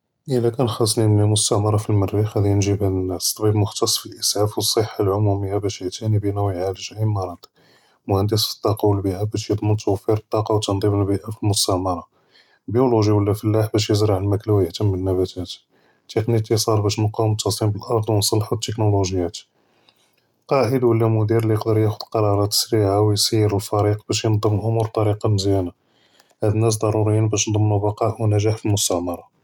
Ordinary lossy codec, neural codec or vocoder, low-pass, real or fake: none; none; 19.8 kHz; real